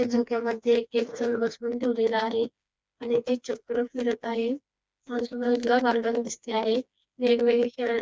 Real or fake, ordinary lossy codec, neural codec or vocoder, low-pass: fake; none; codec, 16 kHz, 2 kbps, FreqCodec, smaller model; none